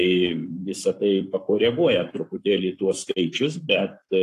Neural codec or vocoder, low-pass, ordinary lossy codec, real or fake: codec, 44.1 kHz, 7.8 kbps, Pupu-Codec; 14.4 kHz; AAC, 64 kbps; fake